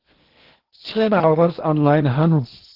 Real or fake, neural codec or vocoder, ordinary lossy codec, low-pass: fake; codec, 16 kHz in and 24 kHz out, 0.8 kbps, FocalCodec, streaming, 65536 codes; Opus, 16 kbps; 5.4 kHz